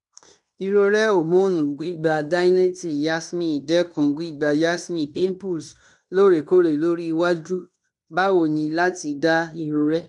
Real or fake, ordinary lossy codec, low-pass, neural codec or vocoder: fake; none; 10.8 kHz; codec, 16 kHz in and 24 kHz out, 0.9 kbps, LongCat-Audio-Codec, fine tuned four codebook decoder